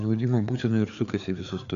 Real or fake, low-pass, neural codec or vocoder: fake; 7.2 kHz; codec, 16 kHz, 4 kbps, FreqCodec, larger model